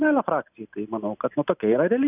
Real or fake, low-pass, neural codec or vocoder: real; 3.6 kHz; none